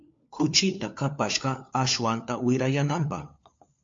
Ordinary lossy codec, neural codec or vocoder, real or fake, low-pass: MP3, 48 kbps; codec, 16 kHz, 4 kbps, FunCodec, trained on LibriTTS, 50 frames a second; fake; 7.2 kHz